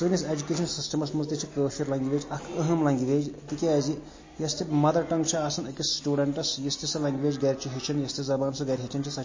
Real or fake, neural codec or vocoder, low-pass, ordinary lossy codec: real; none; 7.2 kHz; MP3, 32 kbps